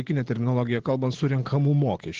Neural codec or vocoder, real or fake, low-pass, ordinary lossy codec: none; real; 7.2 kHz; Opus, 16 kbps